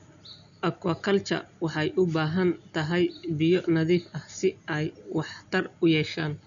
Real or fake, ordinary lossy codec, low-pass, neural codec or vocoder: real; none; 7.2 kHz; none